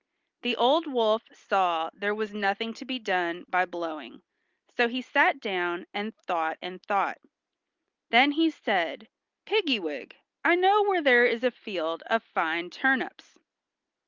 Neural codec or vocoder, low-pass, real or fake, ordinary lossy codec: none; 7.2 kHz; real; Opus, 32 kbps